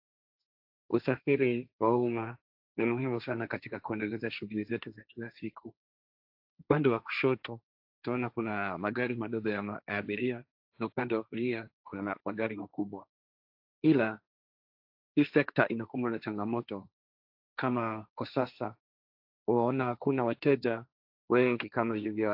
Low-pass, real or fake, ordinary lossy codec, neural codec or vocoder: 5.4 kHz; fake; AAC, 48 kbps; codec, 16 kHz, 1.1 kbps, Voila-Tokenizer